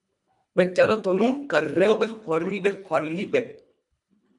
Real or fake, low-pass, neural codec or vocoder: fake; 10.8 kHz; codec, 24 kHz, 1.5 kbps, HILCodec